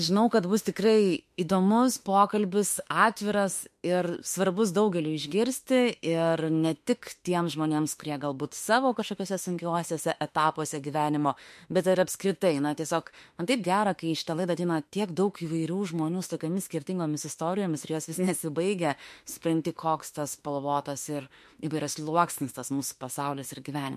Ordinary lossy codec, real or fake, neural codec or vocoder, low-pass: MP3, 64 kbps; fake; autoencoder, 48 kHz, 32 numbers a frame, DAC-VAE, trained on Japanese speech; 14.4 kHz